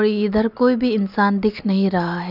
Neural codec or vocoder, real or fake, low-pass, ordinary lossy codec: none; real; 5.4 kHz; none